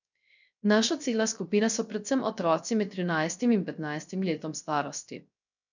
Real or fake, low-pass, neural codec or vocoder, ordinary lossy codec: fake; 7.2 kHz; codec, 16 kHz, 0.3 kbps, FocalCodec; none